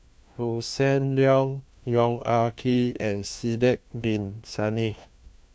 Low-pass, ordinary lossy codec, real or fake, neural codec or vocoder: none; none; fake; codec, 16 kHz, 1 kbps, FunCodec, trained on LibriTTS, 50 frames a second